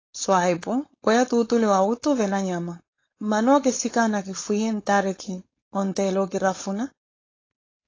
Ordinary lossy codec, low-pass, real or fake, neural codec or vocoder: AAC, 32 kbps; 7.2 kHz; fake; codec, 16 kHz, 4.8 kbps, FACodec